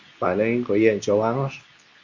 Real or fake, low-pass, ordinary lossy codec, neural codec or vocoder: fake; 7.2 kHz; Opus, 64 kbps; codec, 24 kHz, 0.9 kbps, WavTokenizer, medium speech release version 2